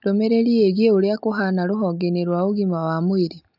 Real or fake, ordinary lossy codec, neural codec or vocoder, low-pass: real; none; none; 5.4 kHz